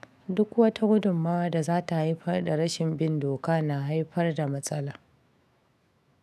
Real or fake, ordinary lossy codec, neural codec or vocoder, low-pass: fake; none; autoencoder, 48 kHz, 128 numbers a frame, DAC-VAE, trained on Japanese speech; 14.4 kHz